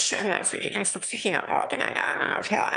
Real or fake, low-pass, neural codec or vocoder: fake; 9.9 kHz; autoencoder, 22.05 kHz, a latent of 192 numbers a frame, VITS, trained on one speaker